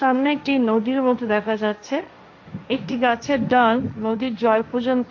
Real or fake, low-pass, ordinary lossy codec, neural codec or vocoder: fake; 7.2 kHz; AAC, 48 kbps; codec, 16 kHz, 1.1 kbps, Voila-Tokenizer